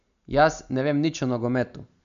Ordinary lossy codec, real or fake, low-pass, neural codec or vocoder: none; real; 7.2 kHz; none